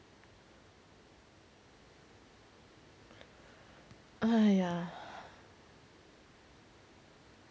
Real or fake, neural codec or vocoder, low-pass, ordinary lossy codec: real; none; none; none